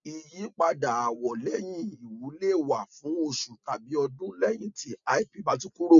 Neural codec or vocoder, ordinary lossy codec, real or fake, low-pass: none; none; real; 7.2 kHz